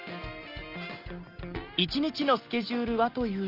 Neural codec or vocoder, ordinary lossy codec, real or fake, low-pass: none; Opus, 24 kbps; real; 5.4 kHz